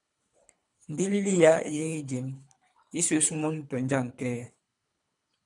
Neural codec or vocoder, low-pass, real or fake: codec, 24 kHz, 3 kbps, HILCodec; 10.8 kHz; fake